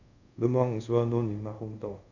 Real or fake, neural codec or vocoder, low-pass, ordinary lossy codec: fake; codec, 24 kHz, 0.5 kbps, DualCodec; 7.2 kHz; none